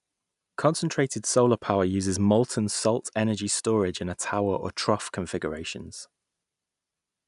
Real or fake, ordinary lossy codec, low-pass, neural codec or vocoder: real; none; 10.8 kHz; none